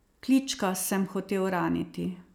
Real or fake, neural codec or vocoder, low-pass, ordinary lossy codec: real; none; none; none